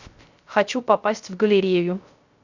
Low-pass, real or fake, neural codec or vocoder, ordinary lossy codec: 7.2 kHz; fake; codec, 16 kHz, 0.3 kbps, FocalCodec; Opus, 64 kbps